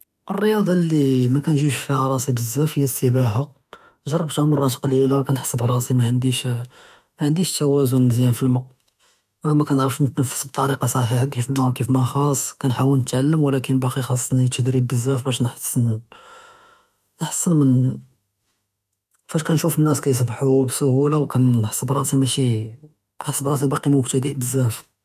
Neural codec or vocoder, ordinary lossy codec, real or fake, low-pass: autoencoder, 48 kHz, 32 numbers a frame, DAC-VAE, trained on Japanese speech; none; fake; 14.4 kHz